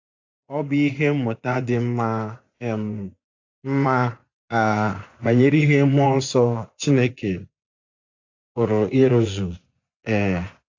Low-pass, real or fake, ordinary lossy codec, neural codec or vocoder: 7.2 kHz; fake; AAC, 48 kbps; vocoder, 24 kHz, 100 mel bands, Vocos